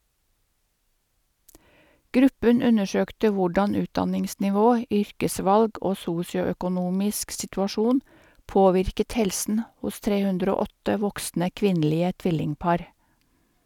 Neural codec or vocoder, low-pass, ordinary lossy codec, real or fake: none; 19.8 kHz; none; real